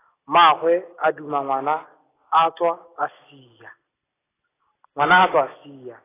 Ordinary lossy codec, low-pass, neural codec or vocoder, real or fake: AAC, 16 kbps; 3.6 kHz; none; real